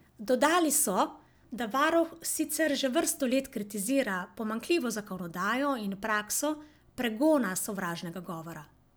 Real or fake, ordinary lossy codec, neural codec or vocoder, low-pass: real; none; none; none